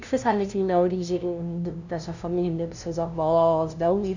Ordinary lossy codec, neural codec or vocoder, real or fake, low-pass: none; codec, 16 kHz, 0.5 kbps, FunCodec, trained on LibriTTS, 25 frames a second; fake; 7.2 kHz